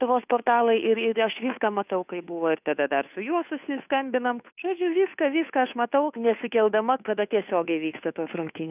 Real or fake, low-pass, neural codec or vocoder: fake; 3.6 kHz; codec, 16 kHz, 2 kbps, FunCodec, trained on Chinese and English, 25 frames a second